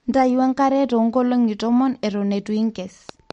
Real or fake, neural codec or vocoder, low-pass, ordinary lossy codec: real; none; 9.9 kHz; MP3, 48 kbps